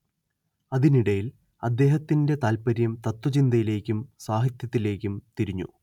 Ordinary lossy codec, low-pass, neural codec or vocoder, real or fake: none; 19.8 kHz; none; real